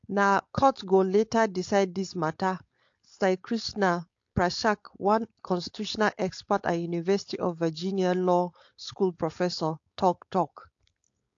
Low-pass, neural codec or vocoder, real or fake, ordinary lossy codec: 7.2 kHz; codec, 16 kHz, 4.8 kbps, FACodec; fake; AAC, 48 kbps